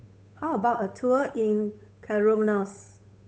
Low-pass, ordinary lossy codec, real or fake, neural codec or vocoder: none; none; fake; codec, 16 kHz, 8 kbps, FunCodec, trained on Chinese and English, 25 frames a second